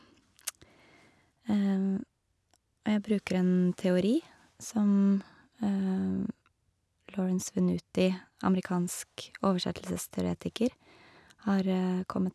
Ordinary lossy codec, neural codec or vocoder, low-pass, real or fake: none; none; none; real